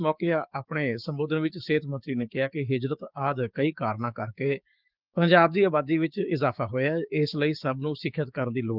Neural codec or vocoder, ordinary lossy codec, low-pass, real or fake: codec, 16 kHz, 6 kbps, DAC; Opus, 32 kbps; 5.4 kHz; fake